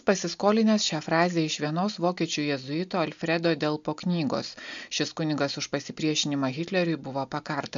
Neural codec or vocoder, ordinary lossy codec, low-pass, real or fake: none; MP3, 64 kbps; 7.2 kHz; real